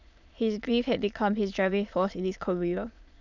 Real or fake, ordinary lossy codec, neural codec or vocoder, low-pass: fake; none; autoencoder, 22.05 kHz, a latent of 192 numbers a frame, VITS, trained on many speakers; 7.2 kHz